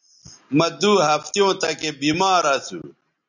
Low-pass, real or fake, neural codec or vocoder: 7.2 kHz; real; none